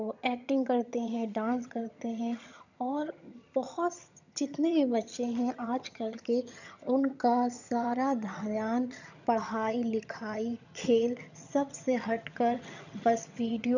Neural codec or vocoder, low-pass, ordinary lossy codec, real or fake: vocoder, 22.05 kHz, 80 mel bands, HiFi-GAN; 7.2 kHz; none; fake